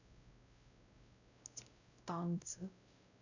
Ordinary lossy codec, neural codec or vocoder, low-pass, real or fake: none; codec, 16 kHz, 0.5 kbps, X-Codec, WavLM features, trained on Multilingual LibriSpeech; 7.2 kHz; fake